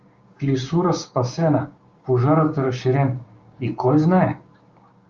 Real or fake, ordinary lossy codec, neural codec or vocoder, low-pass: fake; Opus, 32 kbps; codec, 16 kHz, 6 kbps, DAC; 7.2 kHz